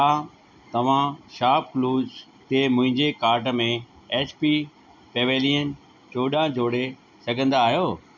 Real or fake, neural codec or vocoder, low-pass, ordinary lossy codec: real; none; 7.2 kHz; none